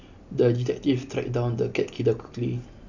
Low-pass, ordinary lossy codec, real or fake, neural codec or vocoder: 7.2 kHz; none; real; none